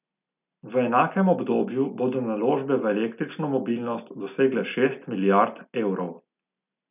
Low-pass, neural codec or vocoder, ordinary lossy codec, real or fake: 3.6 kHz; none; none; real